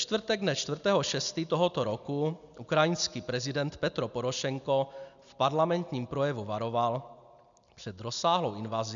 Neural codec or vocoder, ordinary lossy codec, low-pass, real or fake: none; MP3, 96 kbps; 7.2 kHz; real